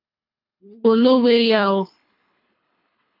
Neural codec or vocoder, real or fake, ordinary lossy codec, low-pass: codec, 24 kHz, 3 kbps, HILCodec; fake; AAC, 32 kbps; 5.4 kHz